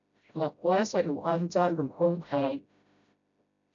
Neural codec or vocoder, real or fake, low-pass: codec, 16 kHz, 0.5 kbps, FreqCodec, smaller model; fake; 7.2 kHz